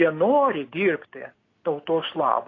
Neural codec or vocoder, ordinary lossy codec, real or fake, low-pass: none; AAC, 32 kbps; real; 7.2 kHz